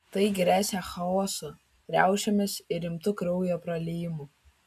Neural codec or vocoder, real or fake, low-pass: none; real; 14.4 kHz